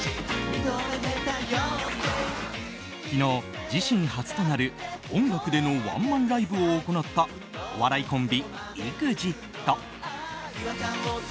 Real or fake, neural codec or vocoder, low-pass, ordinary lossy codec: real; none; none; none